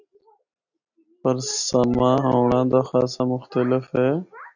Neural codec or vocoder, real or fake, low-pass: none; real; 7.2 kHz